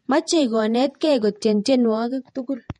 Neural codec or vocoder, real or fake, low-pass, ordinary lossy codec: vocoder, 48 kHz, 128 mel bands, Vocos; fake; 19.8 kHz; MP3, 48 kbps